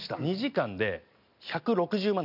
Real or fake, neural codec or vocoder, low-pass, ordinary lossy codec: real; none; 5.4 kHz; none